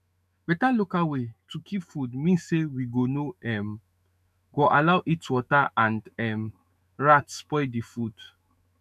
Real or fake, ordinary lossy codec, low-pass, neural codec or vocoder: fake; none; 14.4 kHz; autoencoder, 48 kHz, 128 numbers a frame, DAC-VAE, trained on Japanese speech